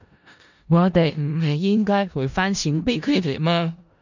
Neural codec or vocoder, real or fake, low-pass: codec, 16 kHz in and 24 kHz out, 0.4 kbps, LongCat-Audio-Codec, four codebook decoder; fake; 7.2 kHz